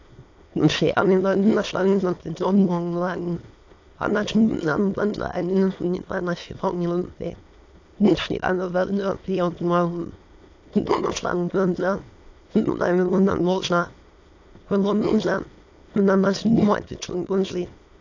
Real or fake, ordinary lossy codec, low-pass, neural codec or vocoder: fake; AAC, 48 kbps; 7.2 kHz; autoencoder, 22.05 kHz, a latent of 192 numbers a frame, VITS, trained on many speakers